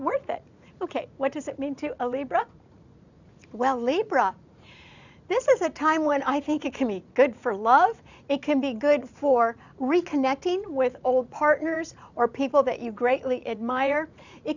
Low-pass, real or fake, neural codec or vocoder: 7.2 kHz; fake; vocoder, 44.1 kHz, 80 mel bands, Vocos